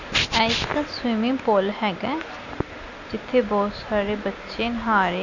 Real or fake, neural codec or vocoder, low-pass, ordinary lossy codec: real; none; 7.2 kHz; none